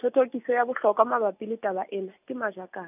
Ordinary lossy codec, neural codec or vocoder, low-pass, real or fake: none; none; 3.6 kHz; real